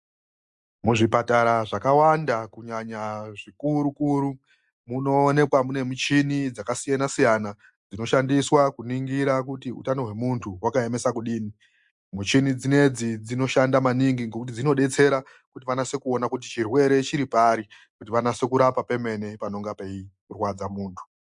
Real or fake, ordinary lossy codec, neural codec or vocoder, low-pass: real; MP3, 64 kbps; none; 10.8 kHz